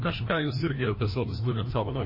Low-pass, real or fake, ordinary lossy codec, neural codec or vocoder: 5.4 kHz; fake; MP3, 24 kbps; codec, 16 kHz, 1 kbps, FreqCodec, larger model